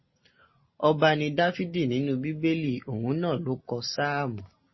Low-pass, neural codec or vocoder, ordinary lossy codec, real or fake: 7.2 kHz; none; MP3, 24 kbps; real